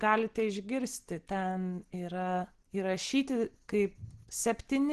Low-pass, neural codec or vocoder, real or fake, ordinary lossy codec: 10.8 kHz; none; real; Opus, 16 kbps